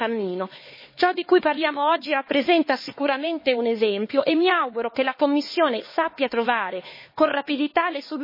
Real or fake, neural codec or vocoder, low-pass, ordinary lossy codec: fake; codec, 16 kHz, 4 kbps, X-Codec, HuBERT features, trained on LibriSpeech; 5.4 kHz; MP3, 24 kbps